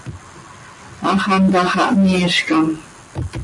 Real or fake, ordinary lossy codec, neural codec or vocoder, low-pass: real; MP3, 48 kbps; none; 10.8 kHz